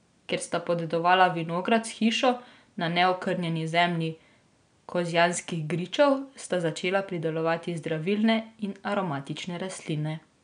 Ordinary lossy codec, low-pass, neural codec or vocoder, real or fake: none; 9.9 kHz; none; real